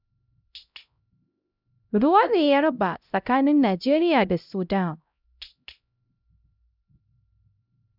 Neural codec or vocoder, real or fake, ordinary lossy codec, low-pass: codec, 16 kHz, 0.5 kbps, X-Codec, HuBERT features, trained on LibriSpeech; fake; none; 5.4 kHz